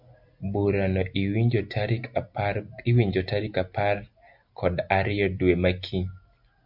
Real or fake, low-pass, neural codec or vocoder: real; 5.4 kHz; none